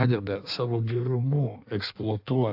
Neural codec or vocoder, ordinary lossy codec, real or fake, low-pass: codec, 16 kHz in and 24 kHz out, 1.1 kbps, FireRedTTS-2 codec; AAC, 48 kbps; fake; 5.4 kHz